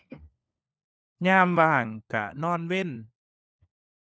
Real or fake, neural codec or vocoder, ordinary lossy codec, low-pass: fake; codec, 16 kHz, 4 kbps, FunCodec, trained on LibriTTS, 50 frames a second; none; none